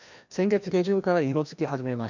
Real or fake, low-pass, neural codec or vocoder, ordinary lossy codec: fake; 7.2 kHz; codec, 16 kHz, 1 kbps, FreqCodec, larger model; none